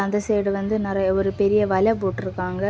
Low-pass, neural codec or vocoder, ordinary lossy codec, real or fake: none; none; none; real